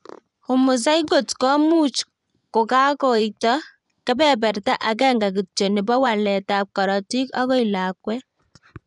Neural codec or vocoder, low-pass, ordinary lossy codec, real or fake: vocoder, 24 kHz, 100 mel bands, Vocos; 10.8 kHz; none; fake